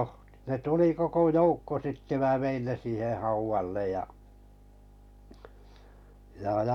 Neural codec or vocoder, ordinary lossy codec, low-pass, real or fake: none; none; 19.8 kHz; real